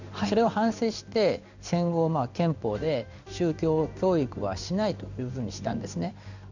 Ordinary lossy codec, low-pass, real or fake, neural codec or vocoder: none; 7.2 kHz; fake; codec, 16 kHz in and 24 kHz out, 1 kbps, XY-Tokenizer